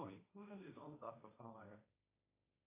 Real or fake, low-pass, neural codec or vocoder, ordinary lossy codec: fake; 3.6 kHz; codec, 16 kHz, 1.1 kbps, Voila-Tokenizer; AAC, 24 kbps